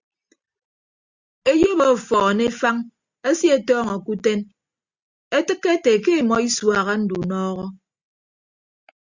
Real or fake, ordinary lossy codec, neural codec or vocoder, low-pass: real; Opus, 64 kbps; none; 7.2 kHz